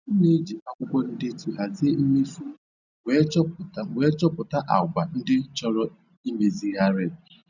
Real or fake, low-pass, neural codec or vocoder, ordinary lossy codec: real; 7.2 kHz; none; none